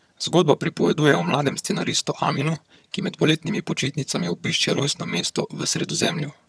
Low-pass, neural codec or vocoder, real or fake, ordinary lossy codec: none; vocoder, 22.05 kHz, 80 mel bands, HiFi-GAN; fake; none